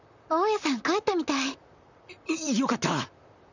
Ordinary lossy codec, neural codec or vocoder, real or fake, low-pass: none; vocoder, 44.1 kHz, 128 mel bands, Pupu-Vocoder; fake; 7.2 kHz